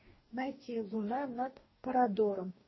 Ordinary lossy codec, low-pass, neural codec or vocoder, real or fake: MP3, 24 kbps; 7.2 kHz; codec, 44.1 kHz, 2.6 kbps, DAC; fake